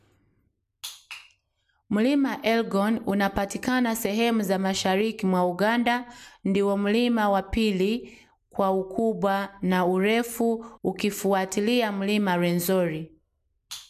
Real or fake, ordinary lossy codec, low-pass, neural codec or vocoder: real; MP3, 96 kbps; 14.4 kHz; none